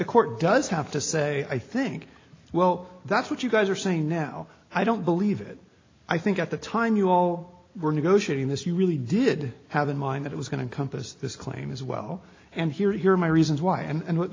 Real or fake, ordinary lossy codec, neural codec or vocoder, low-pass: real; AAC, 32 kbps; none; 7.2 kHz